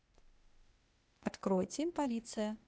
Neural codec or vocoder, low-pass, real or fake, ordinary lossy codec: codec, 16 kHz, 0.8 kbps, ZipCodec; none; fake; none